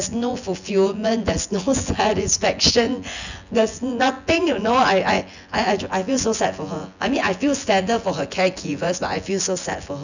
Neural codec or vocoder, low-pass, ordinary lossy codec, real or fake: vocoder, 24 kHz, 100 mel bands, Vocos; 7.2 kHz; none; fake